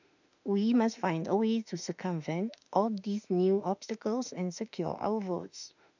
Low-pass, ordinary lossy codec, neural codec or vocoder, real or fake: 7.2 kHz; none; autoencoder, 48 kHz, 32 numbers a frame, DAC-VAE, trained on Japanese speech; fake